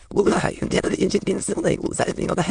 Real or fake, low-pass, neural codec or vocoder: fake; 9.9 kHz; autoencoder, 22.05 kHz, a latent of 192 numbers a frame, VITS, trained on many speakers